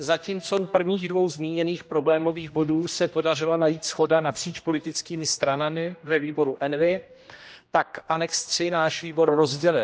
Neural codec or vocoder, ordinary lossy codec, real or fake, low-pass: codec, 16 kHz, 1 kbps, X-Codec, HuBERT features, trained on general audio; none; fake; none